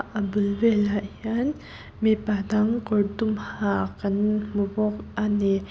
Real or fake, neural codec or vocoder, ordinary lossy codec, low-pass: real; none; none; none